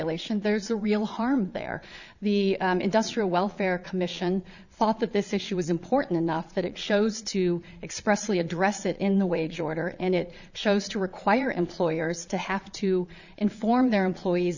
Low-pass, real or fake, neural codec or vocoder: 7.2 kHz; fake; vocoder, 22.05 kHz, 80 mel bands, Vocos